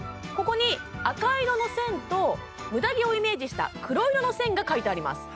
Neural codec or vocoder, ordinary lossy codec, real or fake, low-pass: none; none; real; none